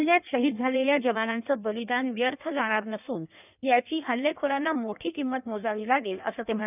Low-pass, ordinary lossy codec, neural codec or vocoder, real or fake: 3.6 kHz; none; codec, 16 kHz in and 24 kHz out, 1.1 kbps, FireRedTTS-2 codec; fake